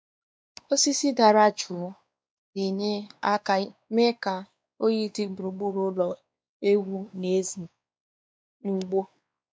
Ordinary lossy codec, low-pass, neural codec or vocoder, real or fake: none; none; codec, 16 kHz, 2 kbps, X-Codec, WavLM features, trained on Multilingual LibriSpeech; fake